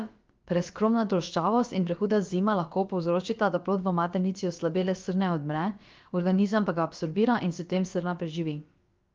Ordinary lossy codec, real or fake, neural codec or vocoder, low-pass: Opus, 24 kbps; fake; codec, 16 kHz, about 1 kbps, DyCAST, with the encoder's durations; 7.2 kHz